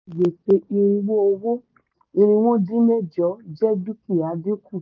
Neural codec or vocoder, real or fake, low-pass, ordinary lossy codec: none; real; 7.2 kHz; none